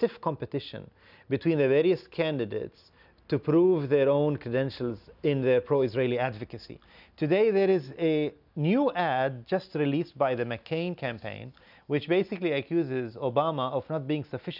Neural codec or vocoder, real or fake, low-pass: none; real; 5.4 kHz